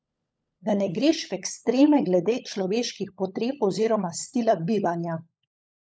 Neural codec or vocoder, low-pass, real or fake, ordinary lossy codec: codec, 16 kHz, 16 kbps, FunCodec, trained on LibriTTS, 50 frames a second; none; fake; none